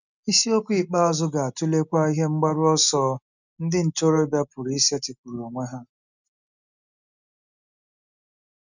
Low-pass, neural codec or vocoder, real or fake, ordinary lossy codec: 7.2 kHz; none; real; none